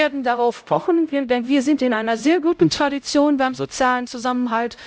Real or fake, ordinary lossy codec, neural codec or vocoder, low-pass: fake; none; codec, 16 kHz, 0.5 kbps, X-Codec, HuBERT features, trained on LibriSpeech; none